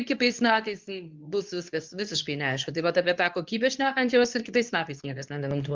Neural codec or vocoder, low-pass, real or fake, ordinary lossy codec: codec, 24 kHz, 0.9 kbps, WavTokenizer, medium speech release version 2; 7.2 kHz; fake; Opus, 24 kbps